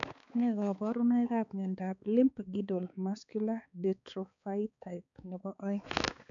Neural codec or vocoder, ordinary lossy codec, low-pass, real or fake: codec, 16 kHz, 4 kbps, X-Codec, HuBERT features, trained on balanced general audio; none; 7.2 kHz; fake